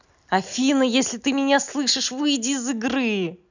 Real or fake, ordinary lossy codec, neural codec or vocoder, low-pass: real; none; none; 7.2 kHz